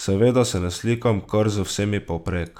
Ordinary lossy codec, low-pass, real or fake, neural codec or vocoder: none; 19.8 kHz; real; none